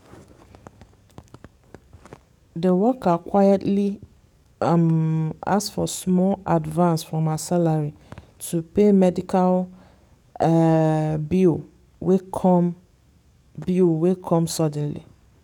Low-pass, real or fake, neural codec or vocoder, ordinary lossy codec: 19.8 kHz; fake; codec, 44.1 kHz, 7.8 kbps, DAC; none